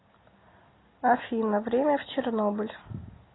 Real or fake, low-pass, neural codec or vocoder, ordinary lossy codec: real; 7.2 kHz; none; AAC, 16 kbps